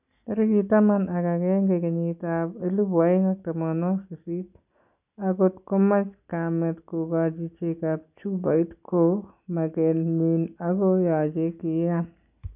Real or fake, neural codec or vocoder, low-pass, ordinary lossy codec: real; none; 3.6 kHz; none